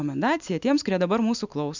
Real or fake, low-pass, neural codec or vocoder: real; 7.2 kHz; none